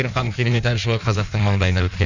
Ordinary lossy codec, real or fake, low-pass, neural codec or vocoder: none; fake; 7.2 kHz; codec, 16 kHz in and 24 kHz out, 1.1 kbps, FireRedTTS-2 codec